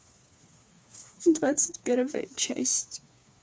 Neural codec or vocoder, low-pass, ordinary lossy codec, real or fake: codec, 16 kHz, 4 kbps, FreqCodec, smaller model; none; none; fake